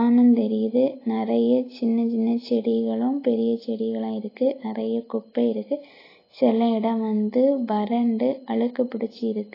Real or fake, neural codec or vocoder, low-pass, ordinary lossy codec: real; none; 5.4 kHz; AAC, 24 kbps